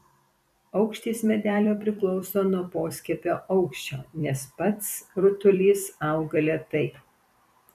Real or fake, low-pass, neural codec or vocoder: fake; 14.4 kHz; vocoder, 44.1 kHz, 128 mel bands every 256 samples, BigVGAN v2